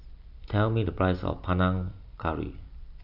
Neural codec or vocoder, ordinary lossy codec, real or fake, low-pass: none; none; real; 5.4 kHz